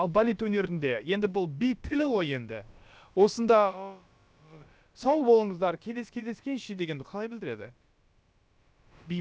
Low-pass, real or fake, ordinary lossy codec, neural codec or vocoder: none; fake; none; codec, 16 kHz, about 1 kbps, DyCAST, with the encoder's durations